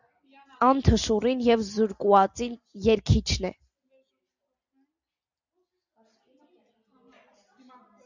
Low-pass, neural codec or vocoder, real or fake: 7.2 kHz; none; real